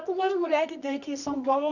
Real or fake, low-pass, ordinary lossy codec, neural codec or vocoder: fake; 7.2 kHz; none; codec, 24 kHz, 0.9 kbps, WavTokenizer, medium music audio release